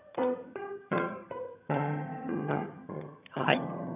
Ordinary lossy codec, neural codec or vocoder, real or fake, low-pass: none; vocoder, 22.05 kHz, 80 mel bands, HiFi-GAN; fake; 3.6 kHz